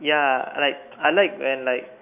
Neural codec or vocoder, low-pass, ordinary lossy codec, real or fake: autoencoder, 48 kHz, 128 numbers a frame, DAC-VAE, trained on Japanese speech; 3.6 kHz; none; fake